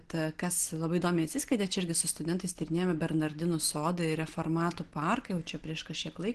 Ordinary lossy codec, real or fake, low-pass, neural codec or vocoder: Opus, 16 kbps; real; 10.8 kHz; none